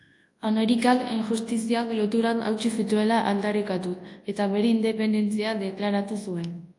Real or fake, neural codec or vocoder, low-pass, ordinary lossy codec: fake; codec, 24 kHz, 0.9 kbps, WavTokenizer, large speech release; 10.8 kHz; AAC, 48 kbps